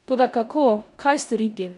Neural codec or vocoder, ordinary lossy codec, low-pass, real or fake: codec, 16 kHz in and 24 kHz out, 0.9 kbps, LongCat-Audio-Codec, four codebook decoder; none; 10.8 kHz; fake